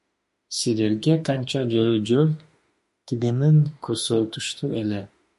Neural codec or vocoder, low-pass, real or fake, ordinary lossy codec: autoencoder, 48 kHz, 32 numbers a frame, DAC-VAE, trained on Japanese speech; 14.4 kHz; fake; MP3, 48 kbps